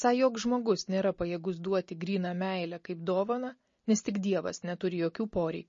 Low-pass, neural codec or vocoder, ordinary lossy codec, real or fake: 7.2 kHz; none; MP3, 32 kbps; real